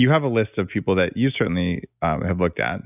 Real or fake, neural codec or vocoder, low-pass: real; none; 3.6 kHz